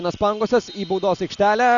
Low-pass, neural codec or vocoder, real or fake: 7.2 kHz; none; real